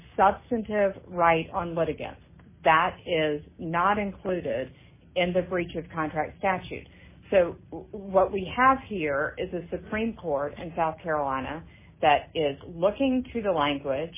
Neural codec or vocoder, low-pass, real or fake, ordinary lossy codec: none; 3.6 kHz; real; MP3, 16 kbps